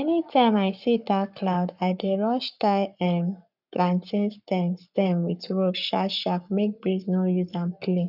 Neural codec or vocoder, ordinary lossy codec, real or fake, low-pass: codec, 44.1 kHz, 7.8 kbps, Pupu-Codec; none; fake; 5.4 kHz